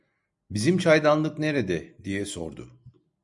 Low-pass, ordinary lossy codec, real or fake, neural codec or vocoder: 10.8 kHz; MP3, 96 kbps; real; none